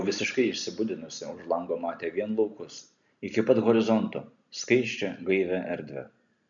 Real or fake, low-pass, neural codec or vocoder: real; 7.2 kHz; none